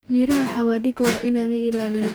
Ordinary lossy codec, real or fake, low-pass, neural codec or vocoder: none; fake; none; codec, 44.1 kHz, 2.6 kbps, DAC